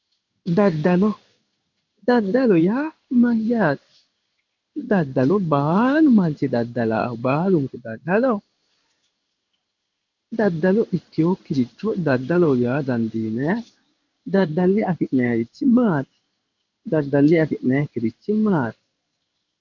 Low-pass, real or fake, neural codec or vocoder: 7.2 kHz; fake; codec, 16 kHz in and 24 kHz out, 1 kbps, XY-Tokenizer